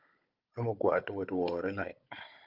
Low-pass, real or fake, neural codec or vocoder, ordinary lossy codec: 5.4 kHz; fake; codec, 16 kHz, 16 kbps, FunCodec, trained on Chinese and English, 50 frames a second; Opus, 24 kbps